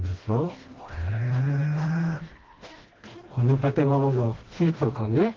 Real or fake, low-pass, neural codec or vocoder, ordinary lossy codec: fake; 7.2 kHz; codec, 16 kHz, 1 kbps, FreqCodec, smaller model; Opus, 16 kbps